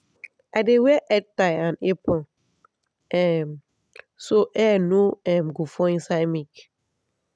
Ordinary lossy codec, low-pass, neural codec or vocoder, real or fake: none; none; none; real